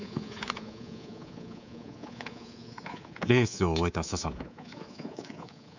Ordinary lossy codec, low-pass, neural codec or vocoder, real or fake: none; 7.2 kHz; codec, 24 kHz, 3.1 kbps, DualCodec; fake